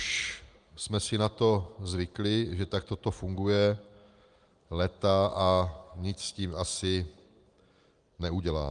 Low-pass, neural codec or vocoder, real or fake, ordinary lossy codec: 9.9 kHz; none; real; Opus, 32 kbps